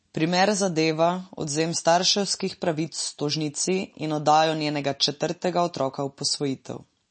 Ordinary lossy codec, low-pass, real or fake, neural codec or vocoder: MP3, 32 kbps; 9.9 kHz; real; none